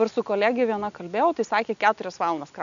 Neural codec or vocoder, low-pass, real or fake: none; 7.2 kHz; real